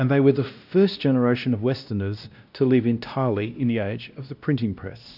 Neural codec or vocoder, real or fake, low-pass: codec, 16 kHz, 1 kbps, X-Codec, WavLM features, trained on Multilingual LibriSpeech; fake; 5.4 kHz